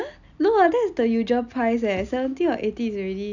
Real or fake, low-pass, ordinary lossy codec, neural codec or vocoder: real; 7.2 kHz; none; none